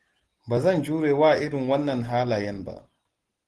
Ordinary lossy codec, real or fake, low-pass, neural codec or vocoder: Opus, 16 kbps; real; 10.8 kHz; none